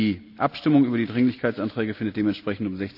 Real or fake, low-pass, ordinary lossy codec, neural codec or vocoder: real; 5.4 kHz; none; none